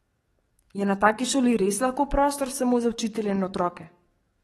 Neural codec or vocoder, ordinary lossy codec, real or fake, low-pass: codec, 44.1 kHz, 7.8 kbps, DAC; AAC, 32 kbps; fake; 19.8 kHz